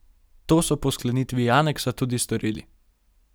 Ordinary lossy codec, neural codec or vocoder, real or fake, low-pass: none; none; real; none